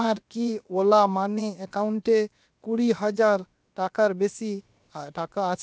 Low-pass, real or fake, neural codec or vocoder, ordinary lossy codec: none; fake; codec, 16 kHz, 0.7 kbps, FocalCodec; none